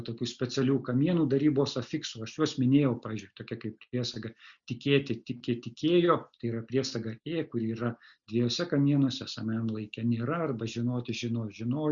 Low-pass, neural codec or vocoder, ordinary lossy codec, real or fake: 7.2 kHz; none; Opus, 64 kbps; real